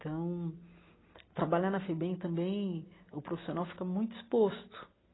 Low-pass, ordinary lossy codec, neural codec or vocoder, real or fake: 7.2 kHz; AAC, 16 kbps; none; real